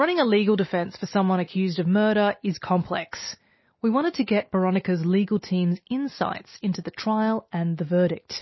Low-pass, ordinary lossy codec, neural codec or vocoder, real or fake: 7.2 kHz; MP3, 24 kbps; none; real